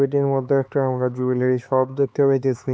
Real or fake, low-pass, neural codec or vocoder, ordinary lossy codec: fake; none; codec, 16 kHz, 4 kbps, X-Codec, HuBERT features, trained on LibriSpeech; none